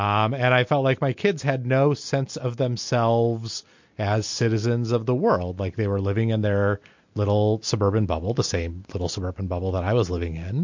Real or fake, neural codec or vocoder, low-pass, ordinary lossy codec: real; none; 7.2 kHz; MP3, 48 kbps